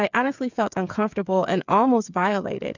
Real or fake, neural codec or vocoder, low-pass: fake; codec, 16 kHz, 8 kbps, FreqCodec, smaller model; 7.2 kHz